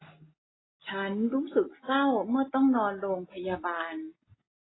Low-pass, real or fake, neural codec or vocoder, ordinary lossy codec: 7.2 kHz; real; none; AAC, 16 kbps